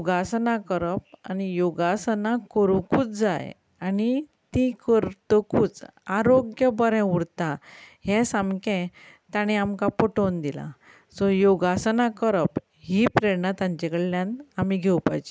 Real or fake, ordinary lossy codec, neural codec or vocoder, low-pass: real; none; none; none